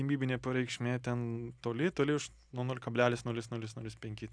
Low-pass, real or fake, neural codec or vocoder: 9.9 kHz; real; none